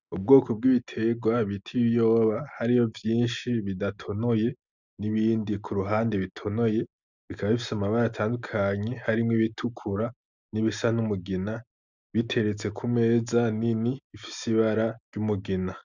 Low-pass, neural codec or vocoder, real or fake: 7.2 kHz; none; real